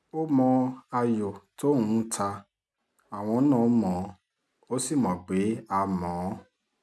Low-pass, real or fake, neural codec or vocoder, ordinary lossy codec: none; real; none; none